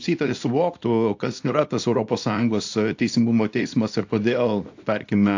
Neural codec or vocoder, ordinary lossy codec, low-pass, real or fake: codec, 24 kHz, 0.9 kbps, WavTokenizer, small release; AAC, 48 kbps; 7.2 kHz; fake